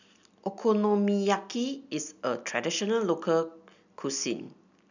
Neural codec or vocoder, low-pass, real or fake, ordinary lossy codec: none; 7.2 kHz; real; none